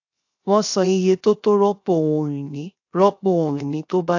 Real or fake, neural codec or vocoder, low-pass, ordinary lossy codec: fake; codec, 16 kHz, 0.7 kbps, FocalCodec; 7.2 kHz; AAC, 48 kbps